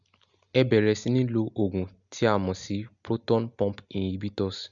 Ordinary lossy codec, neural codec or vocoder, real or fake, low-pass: MP3, 96 kbps; none; real; 7.2 kHz